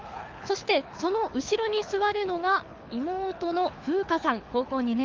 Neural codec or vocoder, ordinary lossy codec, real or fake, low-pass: codec, 24 kHz, 6 kbps, HILCodec; Opus, 24 kbps; fake; 7.2 kHz